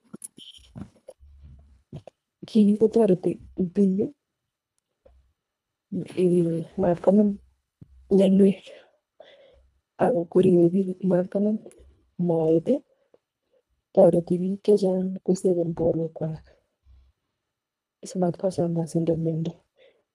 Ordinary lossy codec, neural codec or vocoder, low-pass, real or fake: none; codec, 24 kHz, 1.5 kbps, HILCodec; none; fake